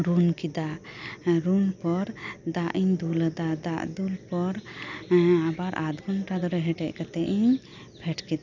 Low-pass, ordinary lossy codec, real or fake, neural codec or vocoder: 7.2 kHz; none; real; none